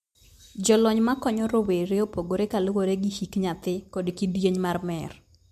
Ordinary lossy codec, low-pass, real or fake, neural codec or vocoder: MP3, 64 kbps; 14.4 kHz; real; none